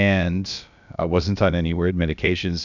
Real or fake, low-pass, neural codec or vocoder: fake; 7.2 kHz; codec, 16 kHz, about 1 kbps, DyCAST, with the encoder's durations